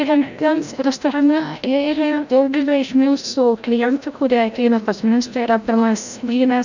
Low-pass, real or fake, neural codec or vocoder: 7.2 kHz; fake; codec, 16 kHz, 0.5 kbps, FreqCodec, larger model